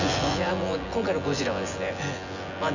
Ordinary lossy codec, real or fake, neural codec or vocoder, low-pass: none; fake; vocoder, 24 kHz, 100 mel bands, Vocos; 7.2 kHz